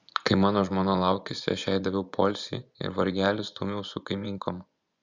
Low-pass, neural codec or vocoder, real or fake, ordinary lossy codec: 7.2 kHz; vocoder, 24 kHz, 100 mel bands, Vocos; fake; Opus, 64 kbps